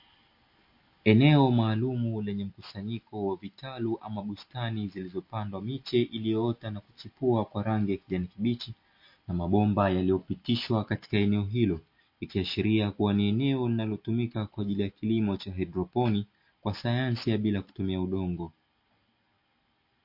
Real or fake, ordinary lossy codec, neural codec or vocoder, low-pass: real; MP3, 32 kbps; none; 5.4 kHz